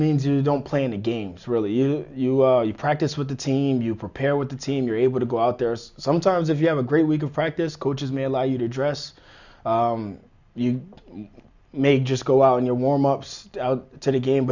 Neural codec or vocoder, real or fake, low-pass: none; real; 7.2 kHz